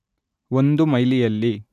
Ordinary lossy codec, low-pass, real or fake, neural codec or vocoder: none; 14.4 kHz; real; none